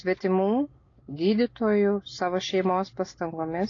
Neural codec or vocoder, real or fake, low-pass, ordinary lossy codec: none; real; 7.2 kHz; AAC, 32 kbps